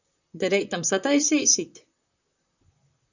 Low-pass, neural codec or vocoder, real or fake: 7.2 kHz; vocoder, 44.1 kHz, 128 mel bands, Pupu-Vocoder; fake